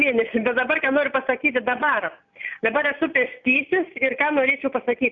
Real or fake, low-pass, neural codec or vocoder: real; 7.2 kHz; none